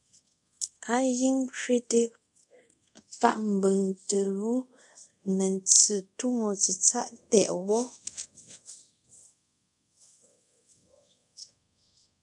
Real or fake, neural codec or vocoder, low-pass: fake; codec, 24 kHz, 0.5 kbps, DualCodec; 10.8 kHz